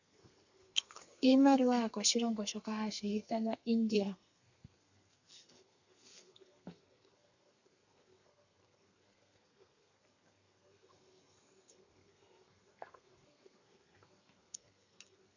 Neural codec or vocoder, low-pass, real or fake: codec, 32 kHz, 1.9 kbps, SNAC; 7.2 kHz; fake